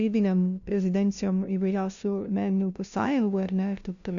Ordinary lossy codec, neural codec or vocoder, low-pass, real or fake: AAC, 64 kbps; codec, 16 kHz, 0.5 kbps, FunCodec, trained on LibriTTS, 25 frames a second; 7.2 kHz; fake